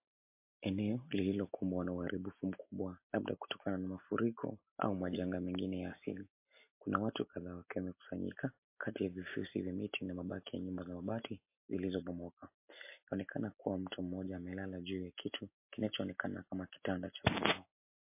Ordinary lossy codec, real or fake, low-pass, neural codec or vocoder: MP3, 24 kbps; real; 3.6 kHz; none